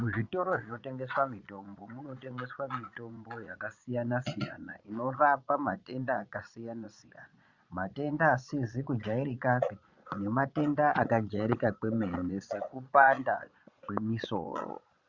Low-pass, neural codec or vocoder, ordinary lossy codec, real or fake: 7.2 kHz; vocoder, 22.05 kHz, 80 mel bands, Vocos; Opus, 64 kbps; fake